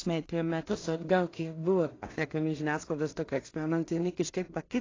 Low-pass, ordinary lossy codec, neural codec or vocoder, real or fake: 7.2 kHz; AAC, 32 kbps; codec, 16 kHz in and 24 kHz out, 0.4 kbps, LongCat-Audio-Codec, two codebook decoder; fake